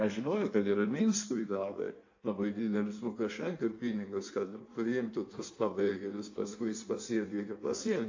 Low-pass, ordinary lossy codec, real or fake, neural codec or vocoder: 7.2 kHz; AAC, 48 kbps; fake; codec, 16 kHz in and 24 kHz out, 1.1 kbps, FireRedTTS-2 codec